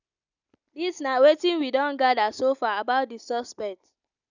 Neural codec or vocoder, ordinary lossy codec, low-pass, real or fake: none; none; 7.2 kHz; real